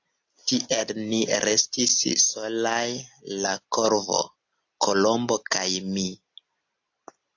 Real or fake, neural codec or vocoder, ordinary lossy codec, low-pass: real; none; AAC, 48 kbps; 7.2 kHz